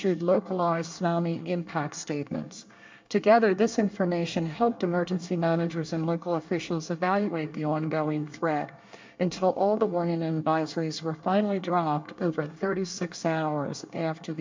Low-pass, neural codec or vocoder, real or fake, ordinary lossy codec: 7.2 kHz; codec, 24 kHz, 1 kbps, SNAC; fake; MP3, 64 kbps